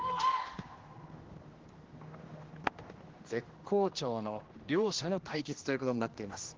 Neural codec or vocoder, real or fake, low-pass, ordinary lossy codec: codec, 16 kHz, 1 kbps, X-Codec, HuBERT features, trained on general audio; fake; 7.2 kHz; Opus, 16 kbps